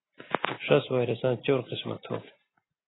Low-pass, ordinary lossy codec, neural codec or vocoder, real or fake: 7.2 kHz; AAC, 16 kbps; none; real